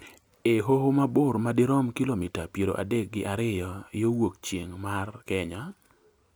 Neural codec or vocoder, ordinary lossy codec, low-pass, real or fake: none; none; none; real